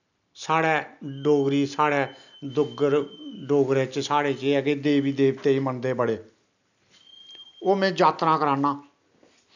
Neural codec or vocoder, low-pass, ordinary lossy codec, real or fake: none; 7.2 kHz; none; real